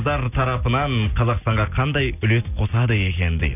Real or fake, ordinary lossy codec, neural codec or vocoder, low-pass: real; none; none; 3.6 kHz